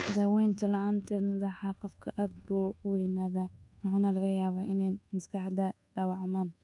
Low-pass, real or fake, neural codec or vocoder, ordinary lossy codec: 10.8 kHz; fake; codec, 24 kHz, 1.2 kbps, DualCodec; none